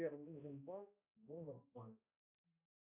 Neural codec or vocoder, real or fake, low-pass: codec, 16 kHz, 0.5 kbps, X-Codec, HuBERT features, trained on balanced general audio; fake; 3.6 kHz